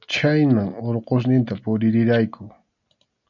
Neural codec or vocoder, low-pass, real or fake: none; 7.2 kHz; real